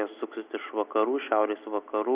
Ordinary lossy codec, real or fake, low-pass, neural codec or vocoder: Opus, 64 kbps; real; 3.6 kHz; none